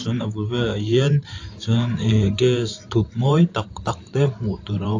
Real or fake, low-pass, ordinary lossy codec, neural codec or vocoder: fake; 7.2 kHz; AAC, 48 kbps; vocoder, 44.1 kHz, 128 mel bands every 512 samples, BigVGAN v2